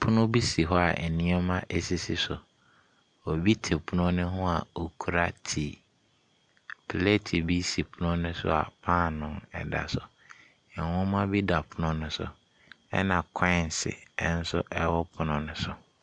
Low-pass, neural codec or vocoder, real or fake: 9.9 kHz; none; real